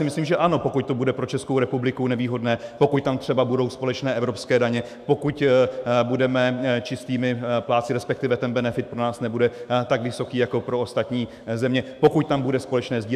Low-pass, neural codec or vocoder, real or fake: 14.4 kHz; autoencoder, 48 kHz, 128 numbers a frame, DAC-VAE, trained on Japanese speech; fake